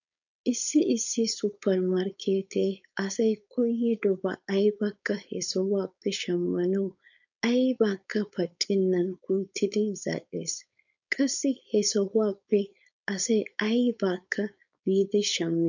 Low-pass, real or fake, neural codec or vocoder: 7.2 kHz; fake; codec, 16 kHz, 4.8 kbps, FACodec